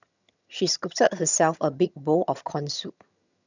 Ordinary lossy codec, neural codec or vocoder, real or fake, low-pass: none; vocoder, 22.05 kHz, 80 mel bands, HiFi-GAN; fake; 7.2 kHz